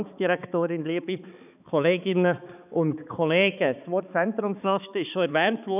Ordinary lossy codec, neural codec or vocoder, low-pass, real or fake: none; codec, 16 kHz, 4 kbps, X-Codec, HuBERT features, trained on balanced general audio; 3.6 kHz; fake